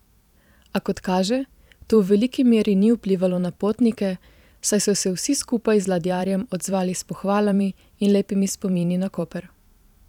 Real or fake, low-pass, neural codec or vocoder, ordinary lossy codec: fake; 19.8 kHz; vocoder, 44.1 kHz, 128 mel bands every 512 samples, BigVGAN v2; none